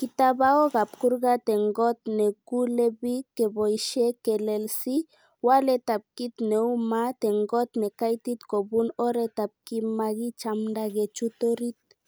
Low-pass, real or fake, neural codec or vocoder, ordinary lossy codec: none; real; none; none